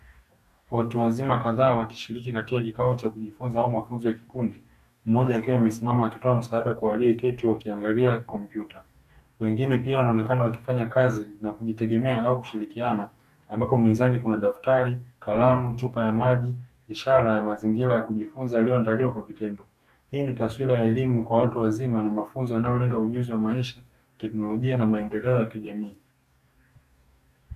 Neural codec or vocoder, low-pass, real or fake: codec, 44.1 kHz, 2.6 kbps, DAC; 14.4 kHz; fake